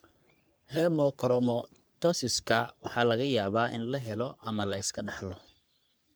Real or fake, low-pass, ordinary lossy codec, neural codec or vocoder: fake; none; none; codec, 44.1 kHz, 3.4 kbps, Pupu-Codec